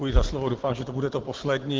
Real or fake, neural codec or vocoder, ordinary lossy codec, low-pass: real; none; Opus, 16 kbps; 7.2 kHz